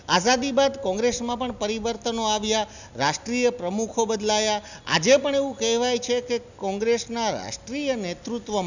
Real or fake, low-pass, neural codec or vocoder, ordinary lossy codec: real; 7.2 kHz; none; none